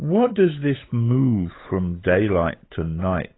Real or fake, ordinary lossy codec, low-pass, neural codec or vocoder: fake; AAC, 16 kbps; 7.2 kHz; codec, 16 kHz, 8 kbps, FunCodec, trained on Chinese and English, 25 frames a second